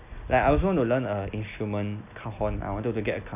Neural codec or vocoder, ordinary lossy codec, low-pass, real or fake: none; none; 3.6 kHz; real